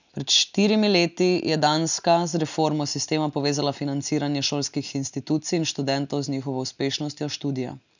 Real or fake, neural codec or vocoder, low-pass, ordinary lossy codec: real; none; 7.2 kHz; none